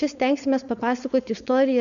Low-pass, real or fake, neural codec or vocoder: 7.2 kHz; fake; codec, 16 kHz, 4.8 kbps, FACodec